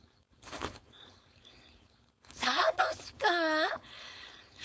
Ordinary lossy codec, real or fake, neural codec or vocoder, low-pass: none; fake; codec, 16 kHz, 4.8 kbps, FACodec; none